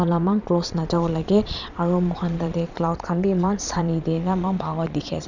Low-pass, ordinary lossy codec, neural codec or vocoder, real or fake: 7.2 kHz; none; vocoder, 22.05 kHz, 80 mel bands, Vocos; fake